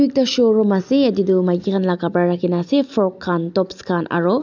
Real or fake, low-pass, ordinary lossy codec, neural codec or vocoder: real; 7.2 kHz; none; none